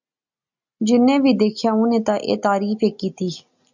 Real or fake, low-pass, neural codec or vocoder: real; 7.2 kHz; none